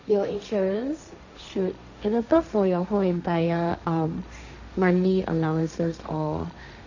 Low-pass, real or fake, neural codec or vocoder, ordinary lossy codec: 7.2 kHz; fake; codec, 16 kHz, 1.1 kbps, Voila-Tokenizer; none